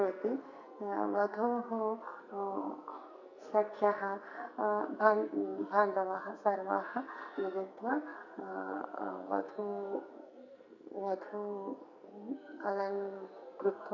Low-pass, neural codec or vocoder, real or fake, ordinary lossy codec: 7.2 kHz; codec, 44.1 kHz, 2.6 kbps, SNAC; fake; none